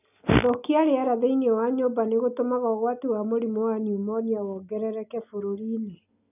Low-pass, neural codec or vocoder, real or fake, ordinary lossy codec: 3.6 kHz; none; real; none